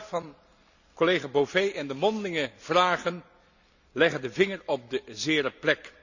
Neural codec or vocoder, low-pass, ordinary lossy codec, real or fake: none; 7.2 kHz; none; real